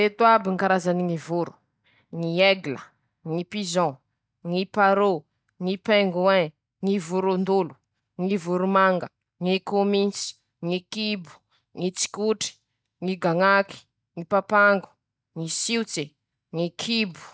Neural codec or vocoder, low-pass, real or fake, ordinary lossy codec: none; none; real; none